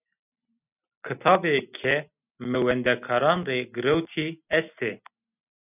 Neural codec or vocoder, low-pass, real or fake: none; 3.6 kHz; real